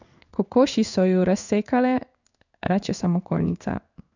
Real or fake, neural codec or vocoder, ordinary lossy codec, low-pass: fake; codec, 16 kHz in and 24 kHz out, 1 kbps, XY-Tokenizer; none; 7.2 kHz